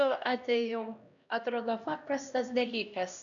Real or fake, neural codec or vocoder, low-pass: fake; codec, 16 kHz, 1 kbps, X-Codec, HuBERT features, trained on LibriSpeech; 7.2 kHz